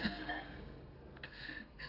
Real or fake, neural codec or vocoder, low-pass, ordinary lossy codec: fake; codec, 32 kHz, 1.9 kbps, SNAC; 5.4 kHz; none